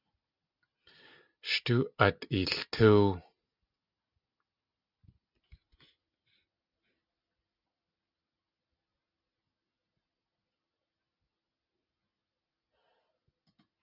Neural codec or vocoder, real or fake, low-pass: none; real; 5.4 kHz